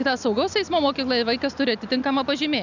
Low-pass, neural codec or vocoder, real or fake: 7.2 kHz; none; real